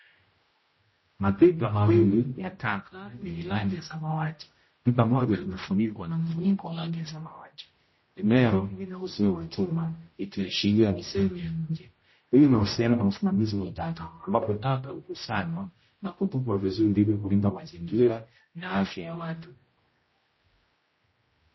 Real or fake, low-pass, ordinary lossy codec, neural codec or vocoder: fake; 7.2 kHz; MP3, 24 kbps; codec, 16 kHz, 0.5 kbps, X-Codec, HuBERT features, trained on general audio